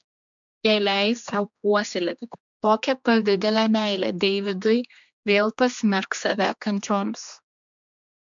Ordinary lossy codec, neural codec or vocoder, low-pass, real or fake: MP3, 64 kbps; codec, 16 kHz, 2 kbps, X-Codec, HuBERT features, trained on general audio; 7.2 kHz; fake